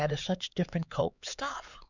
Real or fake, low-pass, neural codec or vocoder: fake; 7.2 kHz; codec, 16 kHz, 4 kbps, X-Codec, HuBERT features, trained on LibriSpeech